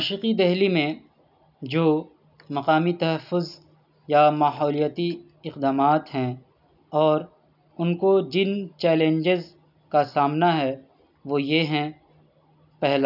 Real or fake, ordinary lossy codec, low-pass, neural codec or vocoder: real; none; 5.4 kHz; none